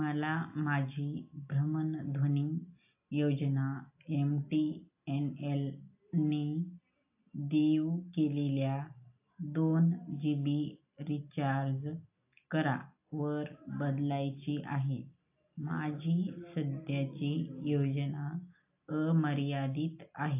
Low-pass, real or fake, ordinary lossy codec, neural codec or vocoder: 3.6 kHz; real; AAC, 32 kbps; none